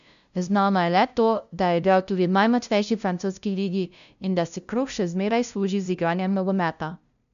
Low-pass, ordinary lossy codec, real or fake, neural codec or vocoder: 7.2 kHz; none; fake; codec, 16 kHz, 0.5 kbps, FunCodec, trained on LibriTTS, 25 frames a second